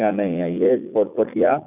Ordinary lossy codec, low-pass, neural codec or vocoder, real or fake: none; 3.6 kHz; vocoder, 44.1 kHz, 80 mel bands, Vocos; fake